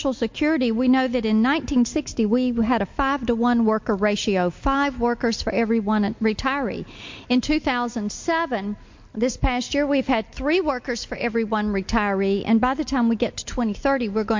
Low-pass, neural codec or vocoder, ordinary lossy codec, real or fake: 7.2 kHz; none; MP3, 48 kbps; real